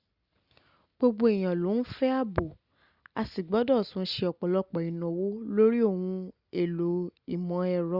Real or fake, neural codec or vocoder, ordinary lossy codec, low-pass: real; none; Opus, 64 kbps; 5.4 kHz